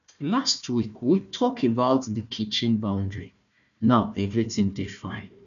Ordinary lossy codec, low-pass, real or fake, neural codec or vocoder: none; 7.2 kHz; fake; codec, 16 kHz, 1 kbps, FunCodec, trained on Chinese and English, 50 frames a second